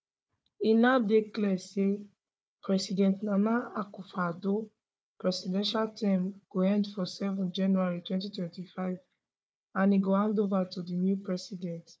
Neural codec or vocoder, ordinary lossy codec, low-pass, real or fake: codec, 16 kHz, 4 kbps, FunCodec, trained on Chinese and English, 50 frames a second; none; none; fake